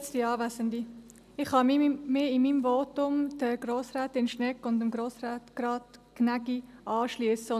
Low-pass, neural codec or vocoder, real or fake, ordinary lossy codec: 14.4 kHz; none; real; none